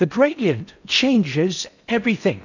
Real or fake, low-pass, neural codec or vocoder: fake; 7.2 kHz; codec, 16 kHz in and 24 kHz out, 0.6 kbps, FocalCodec, streaming, 4096 codes